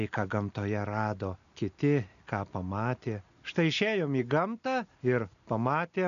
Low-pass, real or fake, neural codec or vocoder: 7.2 kHz; real; none